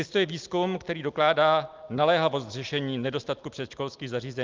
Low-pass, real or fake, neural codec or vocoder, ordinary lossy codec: 7.2 kHz; real; none; Opus, 24 kbps